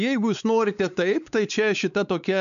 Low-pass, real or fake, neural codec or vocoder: 7.2 kHz; fake; codec, 16 kHz, 4 kbps, X-Codec, WavLM features, trained on Multilingual LibriSpeech